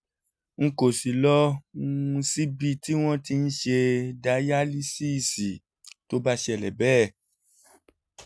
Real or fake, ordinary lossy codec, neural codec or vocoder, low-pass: real; none; none; none